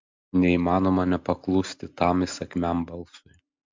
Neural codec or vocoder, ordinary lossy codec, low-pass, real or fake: none; MP3, 64 kbps; 7.2 kHz; real